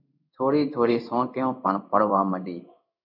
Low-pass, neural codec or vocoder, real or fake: 5.4 kHz; codec, 16 kHz in and 24 kHz out, 1 kbps, XY-Tokenizer; fake